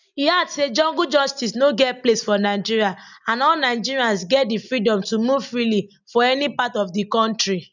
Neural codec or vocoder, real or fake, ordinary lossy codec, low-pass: none; real; none; 7.2 kHz